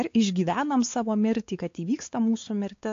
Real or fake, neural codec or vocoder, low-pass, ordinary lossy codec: fake; codec, 16 kHz, 4 kbps, X-Codec, WavLM features, trained on Multilingual LibriSpeech; 7.2 kHz; AAC, 48 kbps